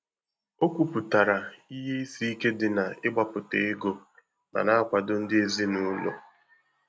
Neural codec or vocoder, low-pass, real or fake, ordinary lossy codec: none; none; real; none